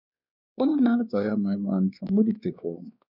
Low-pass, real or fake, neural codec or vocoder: 5.4 kHz; fake; codec, 16 kHz, 2 kbps, X-Codec, WavLM features, trained on Multilingual LibriSpeech